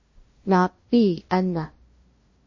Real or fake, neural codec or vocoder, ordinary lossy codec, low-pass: fake; codec, 16 kHz, 0.5 kbps, FunCodec, trained on LibriTTS, 25 frames a second; MP3, 32 kbps; 7.2 kHz